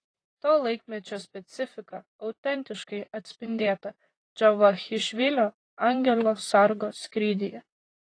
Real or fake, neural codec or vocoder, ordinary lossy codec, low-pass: fake; vocoder, 44.1 kHz, 128 mel bands, Pupu-Vocoder; AAC, 32 kbps; 9.9 kHz